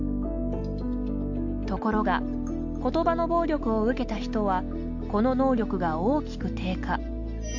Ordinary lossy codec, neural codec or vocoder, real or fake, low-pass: AAC, 48 kbps; none; real; 7.2 kHz